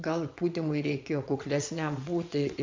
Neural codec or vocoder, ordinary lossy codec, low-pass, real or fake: vocoder, 22.05 kHz, 80 mel bands, WaveNeXt; MP3, 64 kbps; 7.2 kHz; fake